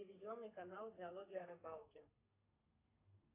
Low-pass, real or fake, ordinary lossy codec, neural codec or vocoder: 3.6 kHz; fake; AAC, 32 kbps; codec, 32 kHz, 1.9 kbps, SNAC